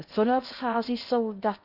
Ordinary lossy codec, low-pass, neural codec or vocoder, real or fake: none; 5.4 kHz; codec, 16 kHz in and 24 kHz out, 0.8 kbps, FocalCodec, streaming, 65536 codes; fake